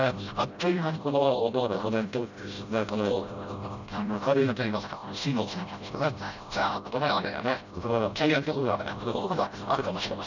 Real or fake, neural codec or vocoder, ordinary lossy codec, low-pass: fake; codec, 16 kHz, 0.5 kbps, FreqCodec, smaller model; none; 7.2 kHz